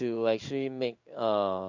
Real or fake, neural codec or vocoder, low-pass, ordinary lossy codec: fake; codec, 16 kHz in and 24 kHz out, 1 kbps, XY-Tokenizer; 7.2 kHz; none